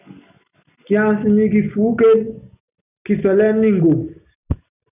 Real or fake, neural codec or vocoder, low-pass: real; none; 3.6 kHz